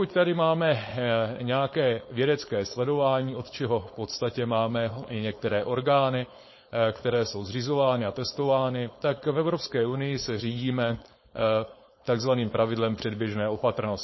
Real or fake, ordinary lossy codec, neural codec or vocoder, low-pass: fake; MP3, 24 kbps; codec, 16 kHz, 4.8 kbps, FACodec; 7.2 kHz